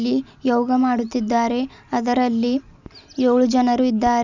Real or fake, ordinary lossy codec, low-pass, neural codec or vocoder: real; none; 7.2 kHz; none